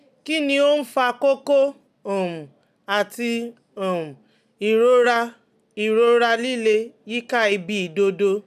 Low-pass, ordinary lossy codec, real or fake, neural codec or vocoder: 14.4 kHz; none; real; none